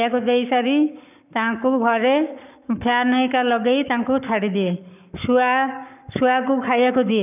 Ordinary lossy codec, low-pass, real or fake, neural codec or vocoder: none; 3.6 kHz; fake; codec, 44.1 kHz, 7.8 kbps, Pupu-Codec